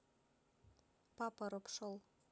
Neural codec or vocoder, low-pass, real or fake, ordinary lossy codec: none; none; real; none